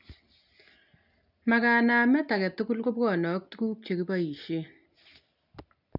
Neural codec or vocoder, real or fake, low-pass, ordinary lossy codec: none; real; 5.4 kHz; none